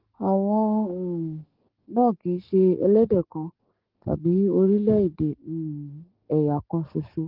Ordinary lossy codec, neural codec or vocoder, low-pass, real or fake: Opus, 16 kbps; codec, 44.1 kHz, 7.8 kbps, DAC; 5.4 kHz; fake